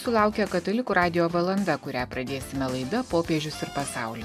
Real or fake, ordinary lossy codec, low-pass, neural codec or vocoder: real; MP3, 96 kbps; 14.4 kHz; none